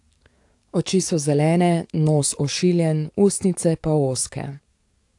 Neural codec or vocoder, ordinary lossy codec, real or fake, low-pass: codec, 44.1 kHz, 7.8 kbps, DAC; AAC, 64 kbps; fake; 10.8 kHz